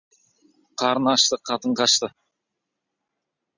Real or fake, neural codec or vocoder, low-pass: real; none; 7.2 kHz